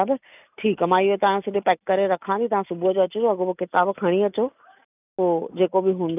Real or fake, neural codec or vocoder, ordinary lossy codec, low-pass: real; none; none; 3.6 kHz